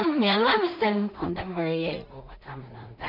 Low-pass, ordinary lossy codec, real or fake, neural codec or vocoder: 5.4 kHz; none; fake; codec, 16 kHz in and 24 kHz out, 0.4 kbps, LongCat-Audio-Codec, two codebook decoder